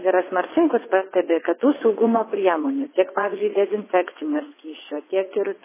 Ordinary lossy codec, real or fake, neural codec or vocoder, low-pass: MP3, 16 kbps; fake; vocoder, 22.05 kHz, 80 mel bands, WaveNeXt; 3.6 kHz